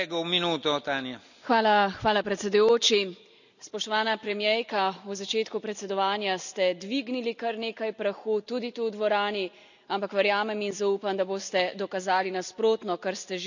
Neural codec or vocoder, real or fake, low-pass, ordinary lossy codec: none; real; 7.2 kHz; none